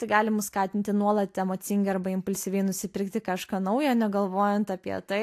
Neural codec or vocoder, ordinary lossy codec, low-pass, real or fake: none; AAC, 64 kbps; 14.4 kHz; real